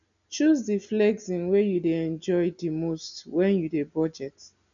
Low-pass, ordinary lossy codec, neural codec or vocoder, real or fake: 7.2 kHz; none; none; real